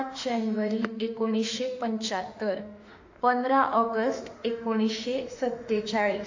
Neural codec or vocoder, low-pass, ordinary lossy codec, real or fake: autoencoder, 48 kHz, 32 numbers a frame, DAC-VAE, trained on Japanese speech; 7.2 kHz; AAC, 48 kbps; fake